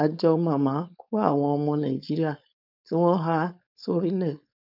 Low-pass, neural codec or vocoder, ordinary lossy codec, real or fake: 5.4 kHz; codec, 16 kHz, 4.8 kbps, FACodec; none; fake